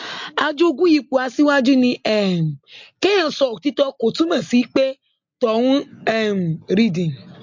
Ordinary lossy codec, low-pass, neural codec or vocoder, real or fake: MP3, 48 kbps; 7.2 kHz; none; real